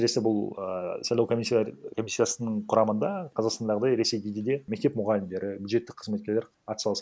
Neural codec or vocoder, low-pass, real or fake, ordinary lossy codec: none; none; real; none